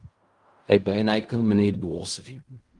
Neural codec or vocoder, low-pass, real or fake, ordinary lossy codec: codec, 16 kHz in and 24 kHz out, 0.4 kbps, LongCat-Audio-Codec, fine tuned four codebook decoder; 10.8 kHz; fake; Opus, 32 kbps